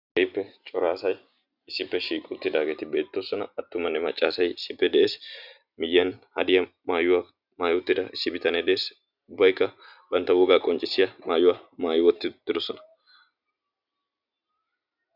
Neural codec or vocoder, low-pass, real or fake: none; 5.4 kHz; real